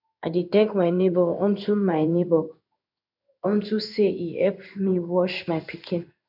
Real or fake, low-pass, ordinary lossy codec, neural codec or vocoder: fake; 5.4 kHz; none; codec, 16 kHz in and 24 kHz out, 1 kbps, XY-Tokenizer